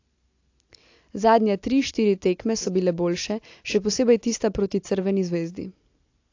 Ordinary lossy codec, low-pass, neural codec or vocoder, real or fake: AAC, 48 kbps; 7.2 kHz; none; real